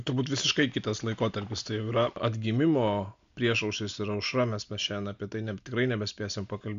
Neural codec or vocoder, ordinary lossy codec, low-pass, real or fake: none; MP3, 64 kbps; 7.2 kHz; real